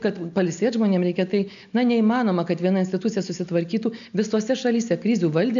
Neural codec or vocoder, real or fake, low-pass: none; real; 7.2 kHz